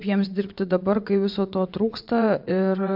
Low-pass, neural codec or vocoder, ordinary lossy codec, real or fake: 5.4 kHz; vocoder, 22.05 kHz, 80 mel bands, WaveNeXt; MP3, 48 kbps; fake